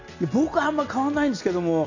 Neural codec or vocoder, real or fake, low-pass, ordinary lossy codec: none; real; 7.2 kHz; none